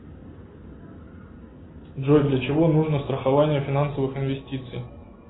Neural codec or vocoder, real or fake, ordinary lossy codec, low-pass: none; real; AAC, 16 kbps; 7.2 kHz